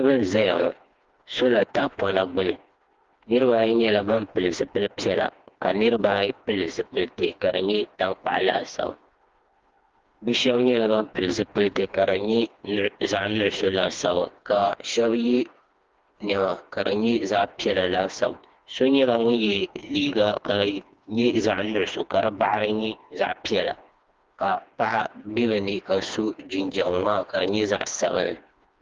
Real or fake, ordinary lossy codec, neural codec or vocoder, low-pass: fake; Opus, 32 kbps; codec, 16 kHz, 2 kbps, FreqCodec, smaller model; 7.2 kHz